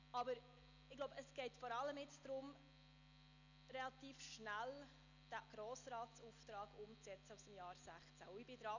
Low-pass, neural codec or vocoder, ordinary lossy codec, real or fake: 7.2 kHz; none; none; real